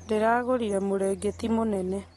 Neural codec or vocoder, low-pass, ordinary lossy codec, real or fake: none; 19.8 kHz; AAC, 32 kbps; real